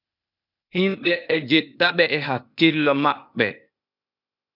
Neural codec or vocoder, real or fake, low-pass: codec, 16 kHz, 0.8 kbps, ZipCodec; fake; 5.4 kHz